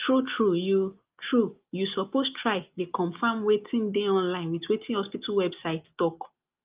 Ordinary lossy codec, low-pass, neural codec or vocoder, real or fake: Opus, 24 kbps; 3.6 kHz; none; real